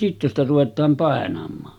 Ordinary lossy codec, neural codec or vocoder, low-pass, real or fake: none; none; 19.8 kHz; real